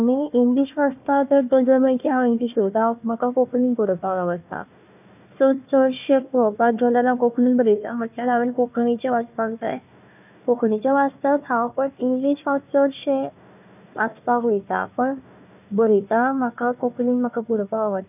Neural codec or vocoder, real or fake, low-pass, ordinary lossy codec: codec, 16 kHz, 1 kbps, FunCodec, trained on Chinese and English, 50 frames a second; fake; 3.6 kHz; none